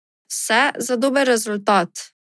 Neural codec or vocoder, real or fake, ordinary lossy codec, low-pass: none; real; none; none